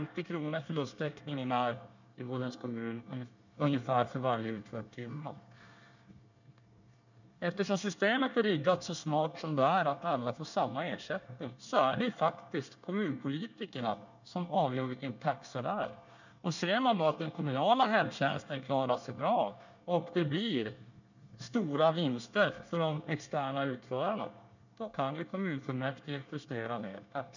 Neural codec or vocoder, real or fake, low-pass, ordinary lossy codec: codec, 24 kHz, 1 kbps, SNAC; fake; 7.2 kHz; none